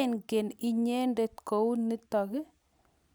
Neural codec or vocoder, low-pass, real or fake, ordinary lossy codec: none; none; real; none